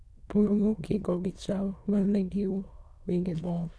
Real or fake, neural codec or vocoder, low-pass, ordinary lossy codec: fake; autoencoder, 22.05 kHz, a latent of 192 numbers a frame, VITS, trained on many speakers; none; none